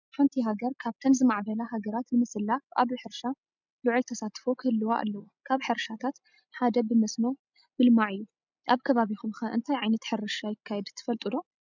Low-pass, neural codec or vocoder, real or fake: 7.2 kHz; none; real